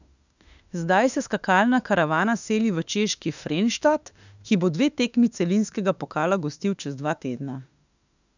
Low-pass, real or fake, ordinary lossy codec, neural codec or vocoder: 7.2 kHz; fake; none; autoencoder, 48 kHz, 32 numbers a frame, DAC-VAE, trained on Japanese speech